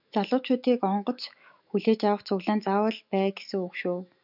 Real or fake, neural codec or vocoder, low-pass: fake; autoencoder, 48 kHz, 128 numbers a frame, DAC-VAE, trained on Japanese speech; 5.4 kHz